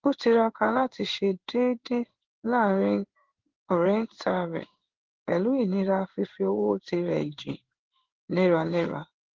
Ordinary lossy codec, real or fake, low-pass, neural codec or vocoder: Opus, 16 kbps; fake; 7.2 kHz; codec, 16 kHz in and 24 kHz out, 1 kbps, XY-Tokenizer